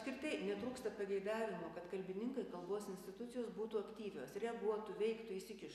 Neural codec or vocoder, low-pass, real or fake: none; 14.4 kHz; real